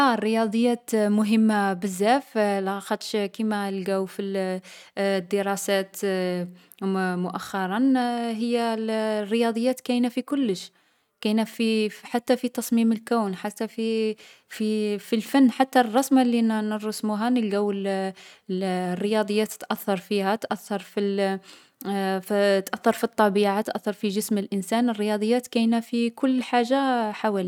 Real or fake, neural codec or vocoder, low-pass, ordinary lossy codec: real; none; 19.8 kHz; none